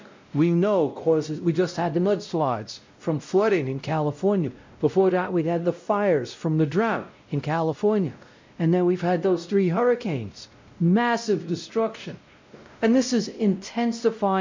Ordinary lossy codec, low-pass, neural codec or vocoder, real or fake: MP3, 64 kbps; 7.2 kHz; codec, 16 kHz, 0.5 kbps, X-Codec, WavLM features, trained on Multilingual LibriSpeech; fake